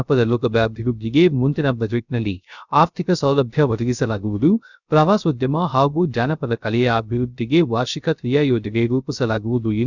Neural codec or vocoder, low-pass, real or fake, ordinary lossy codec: codec, 16 kHz, 0.3 kbps, FocalCodec; 7.2 kHz; fake; none